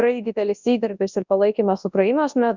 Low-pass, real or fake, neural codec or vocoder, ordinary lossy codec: 7.2 kHz; fake; codec, 24 kHz, 0.9 kbps, WavTokenizer, large speech release; Opus, 64 kbps